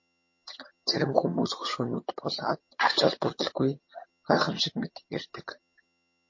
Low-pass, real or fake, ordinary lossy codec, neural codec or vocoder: 7.2 kHz; fake; MP3, 32 kbps; vocoder, 22.05 kHz, 80 mel bands, HiFi-GAN